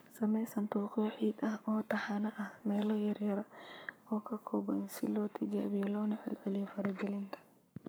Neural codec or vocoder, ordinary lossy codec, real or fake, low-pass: codec, 44.1 kHz, 7.8 kbps, Pupu-Codec; none; fake; none